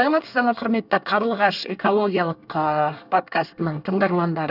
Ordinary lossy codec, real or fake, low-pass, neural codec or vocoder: none; fake; 5.4 kHz; codec, 24 kHz, 1 kbps, SNAC